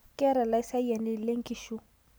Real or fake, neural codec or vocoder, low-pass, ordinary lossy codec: real; none; none; none